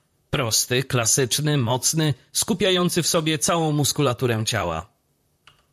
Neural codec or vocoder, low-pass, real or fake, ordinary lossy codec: vocoder, 44.1 kHz, 128 mel bands, Pupu-Vocoder; 14.4 kHz; fake; MP3, 96 kbps